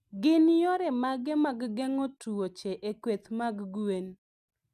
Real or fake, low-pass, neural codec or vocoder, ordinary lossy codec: real; 14.4 kHz; none; none